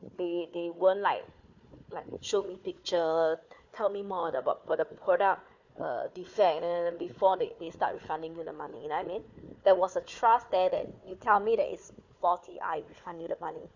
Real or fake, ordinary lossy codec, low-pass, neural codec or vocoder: fake; none; 7.2 kHz; codec, 16 kHz, 4 kbps, FunCodec, trained on Chinese and English, 50 frames a second